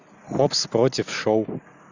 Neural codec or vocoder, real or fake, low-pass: none; real; 7.2 kHz